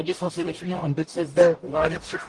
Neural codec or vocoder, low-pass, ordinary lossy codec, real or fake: codec, 44.1 kHz, 0.9 kbps, DAC; 10.8 kHz; Opus, 24 kbps; fake